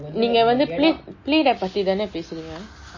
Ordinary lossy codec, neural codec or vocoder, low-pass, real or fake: MP3, 32 kbps; none; 7.2 kHz; real